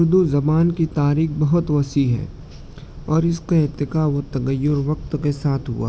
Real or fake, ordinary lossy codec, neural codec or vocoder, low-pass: real; none; none; none